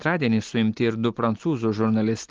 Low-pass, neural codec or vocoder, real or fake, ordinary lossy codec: 7.2 kHz; none; real; Opus, 16 kbps